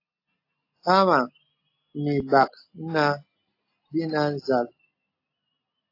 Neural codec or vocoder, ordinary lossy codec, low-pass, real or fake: none; AAC, 32 kbps; 5.4 kHz; real